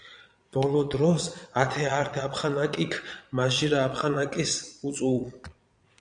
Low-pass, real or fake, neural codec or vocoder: 9.9 kHz; fake; vocoder, 22.05 kHz, 80 mel bands, Vocos